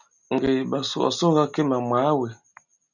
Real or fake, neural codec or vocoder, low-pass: real; none; 7.2 kHz